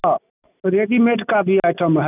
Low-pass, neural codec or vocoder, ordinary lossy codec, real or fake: 3.6 kHz; none; none; real